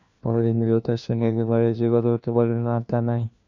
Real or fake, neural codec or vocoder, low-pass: fake; codec, 16 kHz, 1 kbps, FunCodec, trained on LibriTTS, 50 frames a second; 7.2 kHz